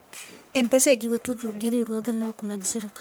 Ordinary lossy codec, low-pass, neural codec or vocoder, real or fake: none; none; codec, 44.1 kHz, 1.7 kbps, Pupu-Codec; fake